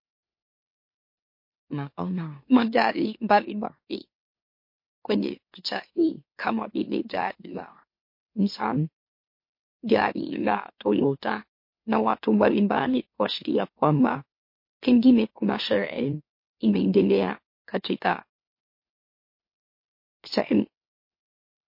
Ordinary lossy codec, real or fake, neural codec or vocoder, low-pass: MP3, 32 kbps; fake; autoencoder, 44.1 kHz, a latent of 192 numbers a frame, MeloTTS; 5.4 kHz